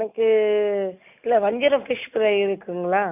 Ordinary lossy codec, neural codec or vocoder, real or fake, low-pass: none; none; real; 3.6 kHz